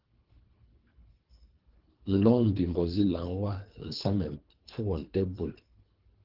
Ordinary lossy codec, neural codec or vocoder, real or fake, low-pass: Opus, 16 kbps; codec, 24 kHz, 3 kbps, HILCodec; fake; 5.4 kHz